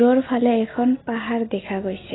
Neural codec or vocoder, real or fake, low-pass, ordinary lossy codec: none; real; 7.2 kHz; AAC, 16 kbps